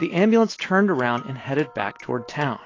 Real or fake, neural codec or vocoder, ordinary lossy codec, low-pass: real; none; AAC, 32 kbps; 7.2 kHz